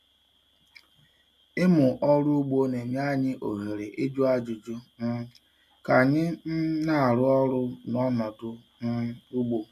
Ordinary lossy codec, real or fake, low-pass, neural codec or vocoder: none; real; 14.4 kHz; none